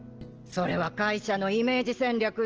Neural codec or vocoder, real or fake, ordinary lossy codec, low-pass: none; real; Opus, 16 kbps; 7.2 kHz